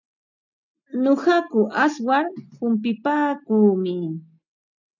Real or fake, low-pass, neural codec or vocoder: real; 7.2 kHz; none